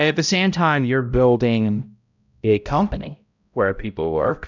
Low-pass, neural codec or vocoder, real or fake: 7.2 kHz; codec, 16 kHz, 0.5 kbps, X-Codec, HuBERT features, trained on balanced general audio; fake